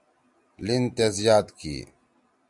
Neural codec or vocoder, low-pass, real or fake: none; 10.8 kHz; real